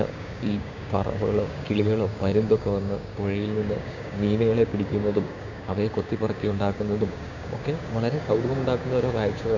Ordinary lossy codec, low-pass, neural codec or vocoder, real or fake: none; 7.2 kHz; codec, 16 kHz, 6 kbps, DAC; fake